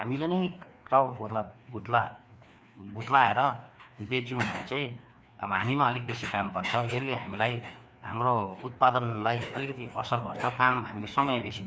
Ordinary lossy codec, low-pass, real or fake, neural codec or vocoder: none; none; fake; codec, 16 kHz, 2 kbps, FreqCodec, larger model